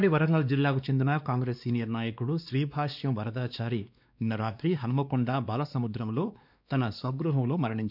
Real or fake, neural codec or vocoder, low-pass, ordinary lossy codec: fake; codec, 16 kHz, 2 kbps, X-Codec, WavLM features, trained on Multilingual LibriSpeech; 5.4 kHz; none